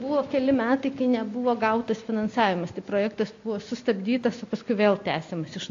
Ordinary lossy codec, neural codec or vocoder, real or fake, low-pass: AAC, 48 kbps; none; real; 7.2 kHz